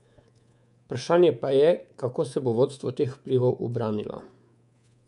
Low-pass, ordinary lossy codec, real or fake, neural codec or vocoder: 10.8 kHz; none; fake; codec, 24 kHz, 3.1 kbps, DualCodec